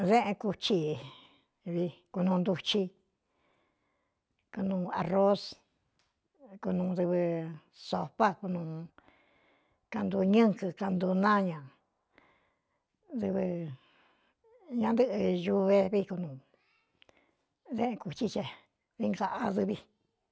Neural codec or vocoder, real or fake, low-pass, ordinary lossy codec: none; real; none; none